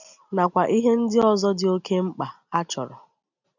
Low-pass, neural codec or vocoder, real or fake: 7.2 kHz; none; real